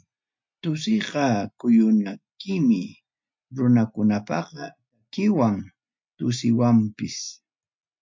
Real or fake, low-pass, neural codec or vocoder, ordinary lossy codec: real; 7.2 kHz; none; MP3, 48 kbps